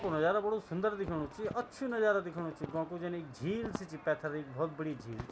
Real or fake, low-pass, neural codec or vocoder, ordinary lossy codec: real; none; none; none